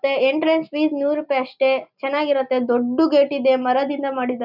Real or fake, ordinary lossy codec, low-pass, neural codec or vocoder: real; none; 5.4 kHz; none